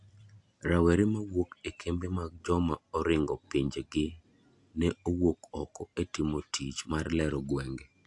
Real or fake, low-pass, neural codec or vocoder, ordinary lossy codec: real; 10.8 kHz; none; AAC, 64 kbps